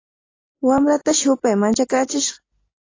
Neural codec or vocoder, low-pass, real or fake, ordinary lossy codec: none; 7.2 kHz; real; AAC, 32 kbps